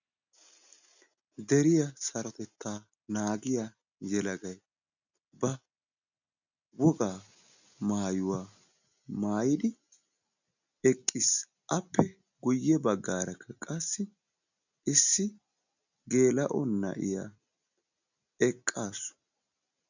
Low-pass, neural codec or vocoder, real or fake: 7.2 kHz; none; real